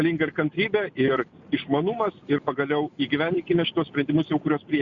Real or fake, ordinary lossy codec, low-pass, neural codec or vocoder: real; Opus, 64 kbps; 7.2 kHz; none